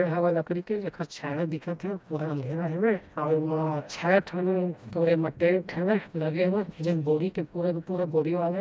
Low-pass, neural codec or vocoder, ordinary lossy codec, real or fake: none; codec, 16 kHz, 1 kbps, FreqCodec, smaller model; none; fake